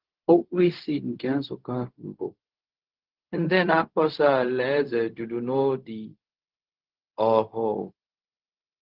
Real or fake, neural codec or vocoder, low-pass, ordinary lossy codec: fake; codec, 16 kHz, 0.4 kbps, LongCat-Audio-Codec; 5.4 kHz; Opus, 16 kbps